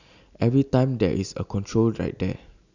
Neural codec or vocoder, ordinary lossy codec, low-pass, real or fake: none; none; 7.2 kHz; real